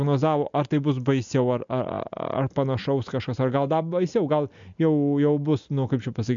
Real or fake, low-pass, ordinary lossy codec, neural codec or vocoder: real; 7.2 kHz; MP3, 64 kbps; none